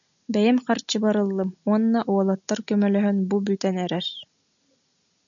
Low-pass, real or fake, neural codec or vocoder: 7.2 kHz; real; none